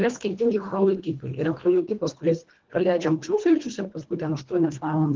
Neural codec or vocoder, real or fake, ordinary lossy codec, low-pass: codec, 24 kHz, 1.5 kbps, HILCodec; fake; Opus, 16 kbps; 7.2 kHz